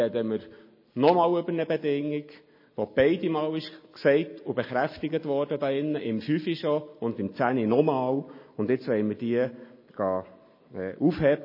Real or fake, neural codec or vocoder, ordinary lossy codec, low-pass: real; none; MP3, 24 kbps; 5.4 kHz